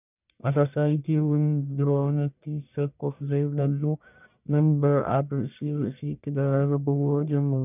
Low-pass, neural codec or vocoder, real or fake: 3.6 kHz; codec, 44.1 kHz, 1.7 kbps, Pupu-Codec; fake